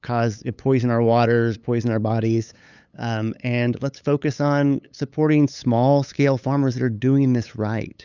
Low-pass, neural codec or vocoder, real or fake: 7.2 kHz; codec, 16 kHz, 8 kbps, FunCodec, trained on LibriTTS, 25 frames a second; fake